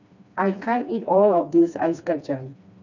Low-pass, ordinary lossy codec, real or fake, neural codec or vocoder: 7.2 kHz; none; fake; codec, 16 kHz, 2 kbps, FreqCodec, smaller model